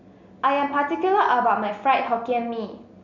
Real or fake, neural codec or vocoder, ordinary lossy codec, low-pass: real; none; none; 7.2 kHz